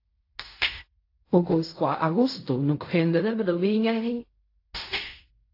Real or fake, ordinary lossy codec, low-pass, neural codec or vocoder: fake; AAC, 32 kbps; 5.4 kHz; codec, 16 kHz in and 24 kHz out, 0.4 kbps, LongCat-Audio-Codec, fine tuned four codebook decoder